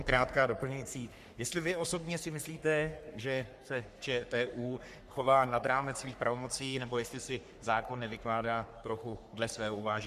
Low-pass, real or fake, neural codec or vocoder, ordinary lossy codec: 14.4 kHz; fake; codec, 44.1 kHz, 3.4 kbps, Pupu-Codec; Opus, 64 kbps